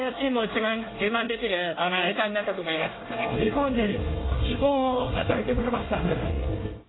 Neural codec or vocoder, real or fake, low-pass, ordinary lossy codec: codec, 24 kHz, 1 kbps, SNAC; fake; 7.2 kHz; AAC, 16 kbps